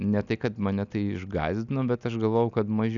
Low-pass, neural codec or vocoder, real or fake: 7.2 kHz; none; real